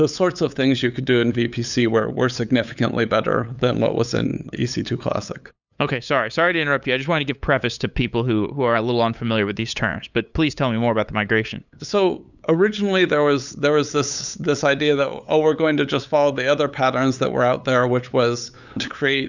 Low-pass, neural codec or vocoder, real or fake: 7.2 kHz; codec, 16 kHz, 8 kbps, FunCodec, trained on LibriTTS, 25 frames a second; fake